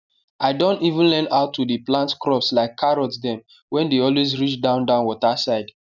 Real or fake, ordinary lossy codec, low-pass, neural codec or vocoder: real; none; 7.2 kHz; none